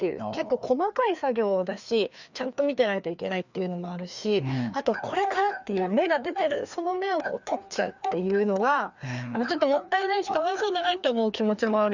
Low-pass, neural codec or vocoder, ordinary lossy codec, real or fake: 7.2 kHz; codec, 16 kHz, 2 kbps, FreqCodec, larger model; none; fake